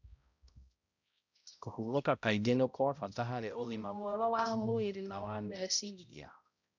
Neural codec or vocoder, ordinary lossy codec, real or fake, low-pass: codec, 16 kHz, 0.5 kbps, X-Codec, HuBERT features, trained on balanced general audio; none; fake; 7.2 kHz